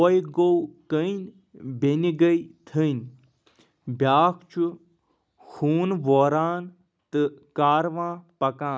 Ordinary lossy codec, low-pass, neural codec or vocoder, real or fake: none; none; none; real